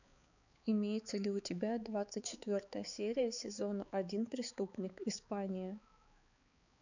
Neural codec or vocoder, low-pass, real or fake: codec, 16 kHz, 4 kbps, X-Codec, HuBERT features, trained on balanced general audio; 7.2 kHz; fake